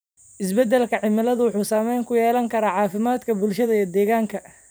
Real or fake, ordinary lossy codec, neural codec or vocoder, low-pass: fake; none; vocoder, 44.1 kHz, 128 mel bands every 512 samples, BigVGAN v2; none